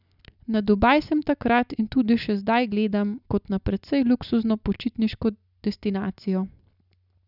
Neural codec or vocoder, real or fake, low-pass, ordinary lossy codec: none; real; 5.4 kHz; none